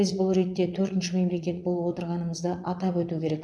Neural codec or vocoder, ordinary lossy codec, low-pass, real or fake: vocoder, 22.05 kHz, 80 mel bands, Vocos; none; none; fake